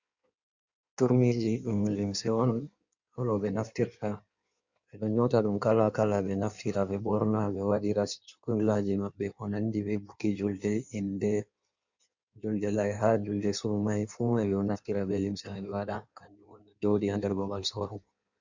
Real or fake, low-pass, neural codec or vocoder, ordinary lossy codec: fake; 7.2 kHz; codec, 16 kHz in and 24 kHz out, 1.1 kbps, FireRedTTS-2 codec; Opus, 64 kbps